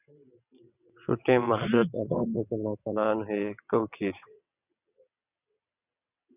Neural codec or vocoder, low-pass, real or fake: vocoder, 22.05 kHz, 80 mel bands, WaveNeXt; 3.6 kHz; fake